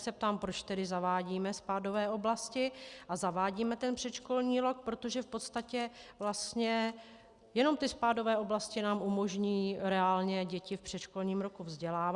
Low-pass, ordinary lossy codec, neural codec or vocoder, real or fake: 10.8 kHz; Opus, 64 kbps; none; real